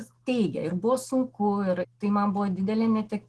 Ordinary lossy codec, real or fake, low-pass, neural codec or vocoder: Opus, 16 kbps; real; 10.8 kHz; none